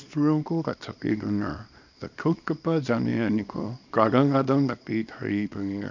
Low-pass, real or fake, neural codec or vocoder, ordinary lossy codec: 7.2 kHz; fake; codec, 24 kHz, 0.9 kbps, WavTokenizer, small release; none